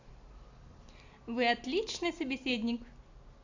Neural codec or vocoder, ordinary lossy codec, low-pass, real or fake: none; AAC, 48 kbps; 7.2 kHz; real